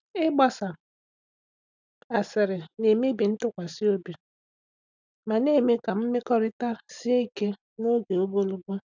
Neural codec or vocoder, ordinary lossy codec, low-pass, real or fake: none; none; 7.2 kHz; real